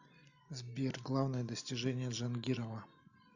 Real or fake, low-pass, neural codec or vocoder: real; 7.2 kHz; none